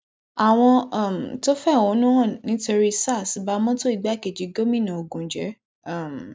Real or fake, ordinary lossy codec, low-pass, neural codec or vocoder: real; none; none; none